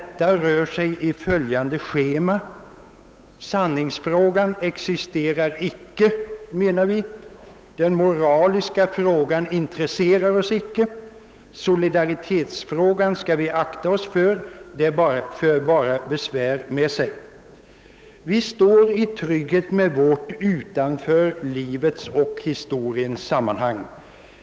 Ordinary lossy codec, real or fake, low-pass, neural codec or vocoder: none; fake; none; codec, 16 kHz, 8 kbps, FunCodec, trained on Chinese and English, 25 frames a second